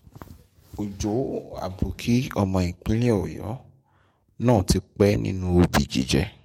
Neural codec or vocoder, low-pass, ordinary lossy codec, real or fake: codec, 44.1 kHz, 7.8 kbps, DAC; 19.8 kHz; MP3, 64 kbps; fake